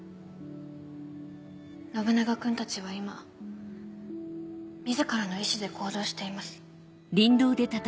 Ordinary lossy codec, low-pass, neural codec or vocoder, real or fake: none; none; none; real